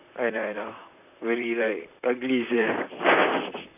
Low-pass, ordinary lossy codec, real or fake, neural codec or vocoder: 3.6 kHz; none; fake; vocoder, 44.1 kHz, 128 mel bands, Pupu-Vocoder